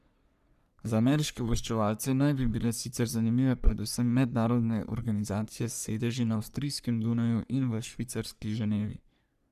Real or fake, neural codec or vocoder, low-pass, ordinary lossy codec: fake; codec, 44.1 kHz, 3.4 kbps, Pupu-Codec; 14.4 kHz; none